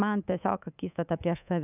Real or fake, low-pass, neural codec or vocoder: fake; 3.6 kHz; codec, 24 kHz, 3.1 kbps, DualCodec